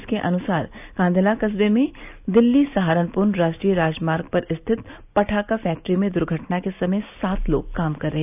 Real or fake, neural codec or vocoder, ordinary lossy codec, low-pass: real; none; none; 3.6 kHz